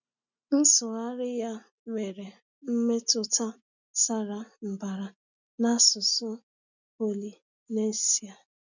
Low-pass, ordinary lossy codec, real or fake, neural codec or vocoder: 7.2 kHz; none; real; none